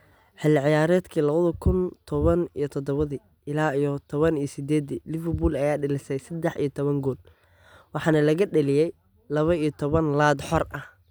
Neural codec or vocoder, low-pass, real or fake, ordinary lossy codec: none; none; real; none